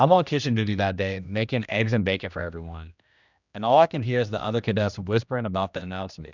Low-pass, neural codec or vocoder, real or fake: 7.2 kHz; codec, 16 kHz, 1 kbps, X-Codec, HuBERT features, trained on general audio; fake